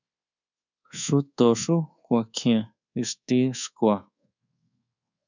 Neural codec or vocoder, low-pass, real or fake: codec, 24 kHz, 1.2 kbps, DualCodec; 7.2 kHz; fake